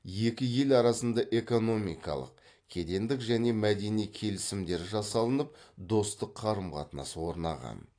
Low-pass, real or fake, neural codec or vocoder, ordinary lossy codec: 9.9 kHz; real; none; AAC, 48 kbps